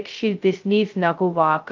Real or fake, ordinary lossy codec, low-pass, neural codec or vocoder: fake; Opus, 24 kbps; 7.2 kHz; codec, 16 kHz, 0.2 kbps, FocalCodec